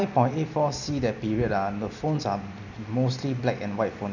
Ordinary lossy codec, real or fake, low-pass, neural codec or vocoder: none; real; 7.2 kHz; none